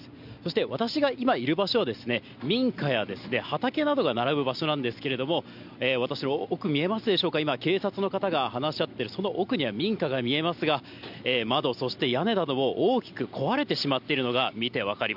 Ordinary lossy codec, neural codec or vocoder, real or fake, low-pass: none; none; real; 5.4 kHz